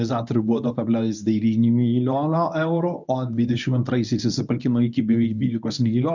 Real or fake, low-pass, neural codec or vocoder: fake; 7.2 kHz; codec, 24 kHz, 0.9 kbps, WavTokenizer, medium speech release version 1